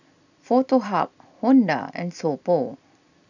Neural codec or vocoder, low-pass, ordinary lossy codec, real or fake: none; 7.2 kHz; none; real